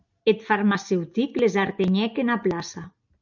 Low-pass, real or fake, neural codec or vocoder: 7.2 kHz; real; none